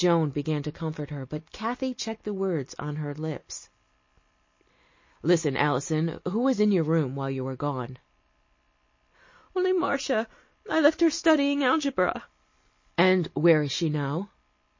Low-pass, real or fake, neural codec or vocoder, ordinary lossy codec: 7.2 kHz; real; none; MP3, 32 kbps